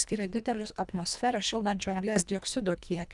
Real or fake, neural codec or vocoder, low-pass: fake; codec, 24 kHz, 1.5 kbps, HILCodec; 10.8 kHz